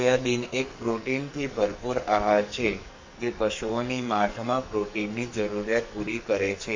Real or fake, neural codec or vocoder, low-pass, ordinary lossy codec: fake; codec, 44.1 kHz, 2.6 kbps, SNAC; 7.2 kHz; MP3, 48 kbps